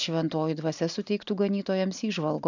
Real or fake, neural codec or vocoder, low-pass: real; none; 7.2 kHz